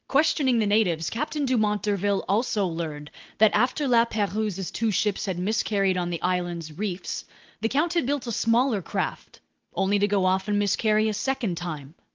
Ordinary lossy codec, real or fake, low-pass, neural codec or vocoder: Opus, 32 kbps; real; 7.2 kHz; none